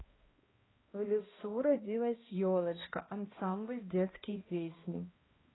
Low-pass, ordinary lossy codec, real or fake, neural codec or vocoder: 7.2 kHz; AAC, 16 kbps; fake; codec, 16 kHz, 1 kbps, X-Codec, HuBERT features, trained on balanced general audio